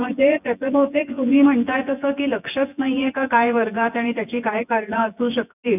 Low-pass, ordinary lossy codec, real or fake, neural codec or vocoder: 3.6 kHz; none; fake; vocoder, 24 kHz, 100 mel bands, Vocos